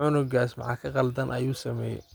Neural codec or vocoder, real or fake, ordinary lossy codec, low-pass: none; real; none; none